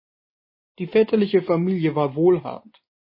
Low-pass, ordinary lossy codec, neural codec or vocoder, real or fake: 5.4 kHz; MP3, 24 kbps; none; real